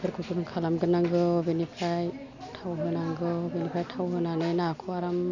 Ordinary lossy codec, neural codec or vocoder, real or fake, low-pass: none; none; real; 7.2 kHz